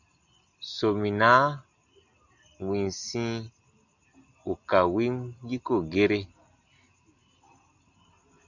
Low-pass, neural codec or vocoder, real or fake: 7.2 kHz; none; real